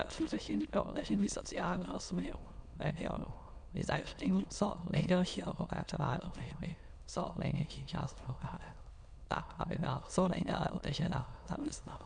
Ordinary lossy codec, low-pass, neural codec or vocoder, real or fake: MP3, 96 kbps; 9.9 kHz; autoencoder, 22.05 kHz, a latent of 192 numbers a frame, VITS, trained on many speakers; fake